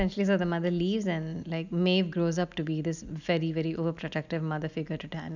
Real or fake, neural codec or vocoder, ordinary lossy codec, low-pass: real; none; none; 7.2 kHz